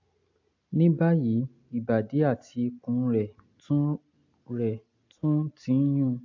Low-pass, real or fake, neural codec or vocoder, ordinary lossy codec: 7.2 kHz; real; none; AAC, 48 kbps